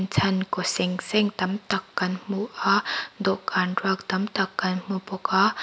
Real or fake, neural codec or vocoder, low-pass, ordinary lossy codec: real; none; none; none